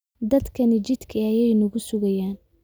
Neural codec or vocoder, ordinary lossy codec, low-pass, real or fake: none; none; none; real